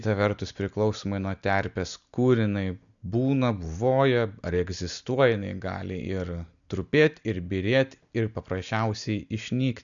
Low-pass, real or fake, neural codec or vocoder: 7.2 kHz; real; none